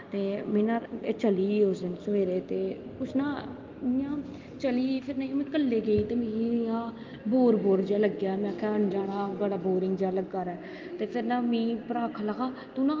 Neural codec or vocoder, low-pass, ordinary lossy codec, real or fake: none; 7.2 kHz; Opus, 32 kbps; real